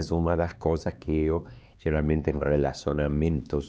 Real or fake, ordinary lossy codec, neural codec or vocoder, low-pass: fake; none; codec, 16 kHz, 2 kbps, X-Codec, HuBERT features, trained on LibriSpeech; none